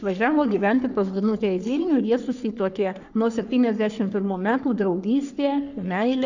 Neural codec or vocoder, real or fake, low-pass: codec, 44.1 kHz, 3.4 kbps, Pupu-Codec; fake; 7.2 kHz